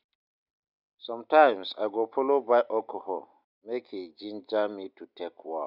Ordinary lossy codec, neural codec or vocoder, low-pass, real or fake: none; none; 5.4 kHz; real